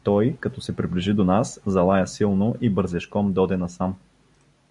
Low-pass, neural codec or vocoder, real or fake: 10.8 kHz; none; real